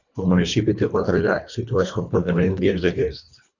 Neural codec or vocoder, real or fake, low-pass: codec, 24 kHz, 1.5 kbps, HILCodec; fake; 7.2 kHz